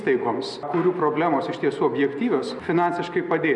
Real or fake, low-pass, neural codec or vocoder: real; 10.8 kHz; none